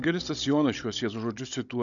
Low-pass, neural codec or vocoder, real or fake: 7.2 kHz; codec, 16 kHz, 16 kbps, FunCodec, trained on Chinese and English, 50 frames a second; fake